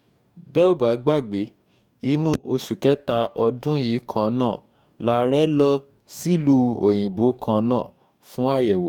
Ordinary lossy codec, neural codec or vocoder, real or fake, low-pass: none; codec, 44.1 kHz, 2.6 kbps, DAC; fake; 19.8 kHz